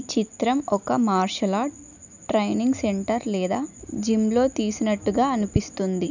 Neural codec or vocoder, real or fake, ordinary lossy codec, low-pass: none; real; none; 7.2 kHz